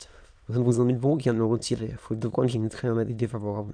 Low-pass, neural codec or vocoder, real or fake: 9.9 kHz; autoencoder, 22.05 kHz, a latent of 192 numbers a frame, VITS, trained on many speakers; fake